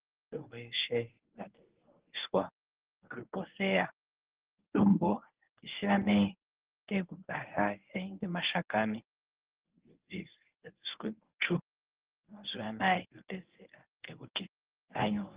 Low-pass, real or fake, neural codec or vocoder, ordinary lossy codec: 3.6 kHz; fake; codec, 24 kHz, 0.9 kbps, WavTokenizer, medium speech release version 1; Opus, 16 kbps